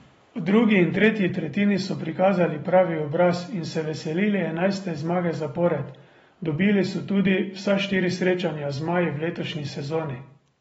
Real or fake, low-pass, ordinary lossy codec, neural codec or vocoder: real; 19.8 kHz; AAC, 24 kbps; none